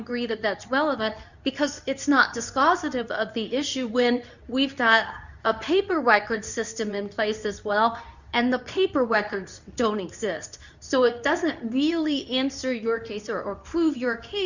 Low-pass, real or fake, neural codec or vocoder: 7.2 kHz; fake; codec, 24 kHz, 0.9 kbps, WavTokenizer, medium speech release version 2